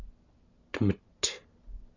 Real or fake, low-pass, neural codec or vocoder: real; 7.2 kHz; none